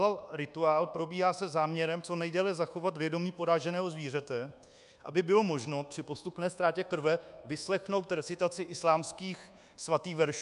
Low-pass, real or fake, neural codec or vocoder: 10.8 kHz; fake; codec, 24 kHz, 1.2 kbps, DualCodec